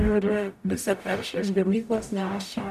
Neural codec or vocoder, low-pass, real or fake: codec, 44.1 kHz, 0.9 kbps, DAC; 14.4 kHz; fake